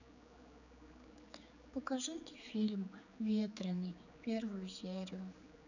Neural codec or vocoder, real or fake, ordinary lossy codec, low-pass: codec, 16 kHz, 4 kbps, X-Codec, HuBERT features, trained on general audio; fake; none; 7.2 kHz